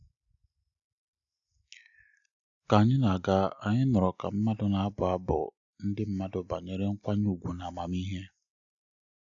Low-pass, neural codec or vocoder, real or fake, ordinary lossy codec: 7.2 kHz; none; real; none